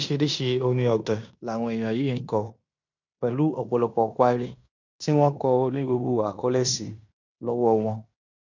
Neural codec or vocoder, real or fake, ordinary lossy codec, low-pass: codec, 16 kHz in and 24 kHz out, 0.9 kbps, LongCat-Audio-Codec, fine tuned four codebook decoder; fake; none; 7.2 kHz